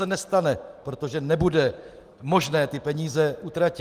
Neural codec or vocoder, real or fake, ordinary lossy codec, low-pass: none; real; Opus, 24 kbps; 14.4 kHz